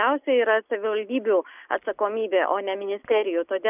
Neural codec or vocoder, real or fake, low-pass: none; real; 3.6 kHz